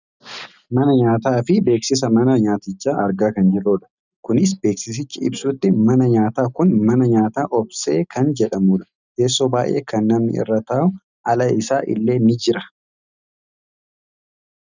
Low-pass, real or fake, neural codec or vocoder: 7.2 kHz; real; none